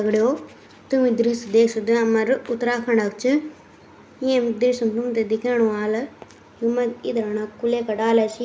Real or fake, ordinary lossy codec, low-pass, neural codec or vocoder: real; none; none; none